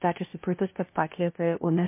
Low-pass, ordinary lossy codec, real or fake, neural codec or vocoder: 3.6 kHz; MP3, 24 kbps; fake; codec, 16 kHz, 0.5 kbps, FunCodec, trained on LibriTTS, 25 frames a second